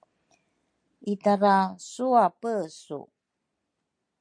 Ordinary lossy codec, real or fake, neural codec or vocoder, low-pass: MP3, 48 kbps; real; none; 9.9 kHz